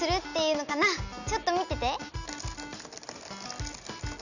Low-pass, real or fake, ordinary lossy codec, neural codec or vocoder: 7.2 kHz; real; none; none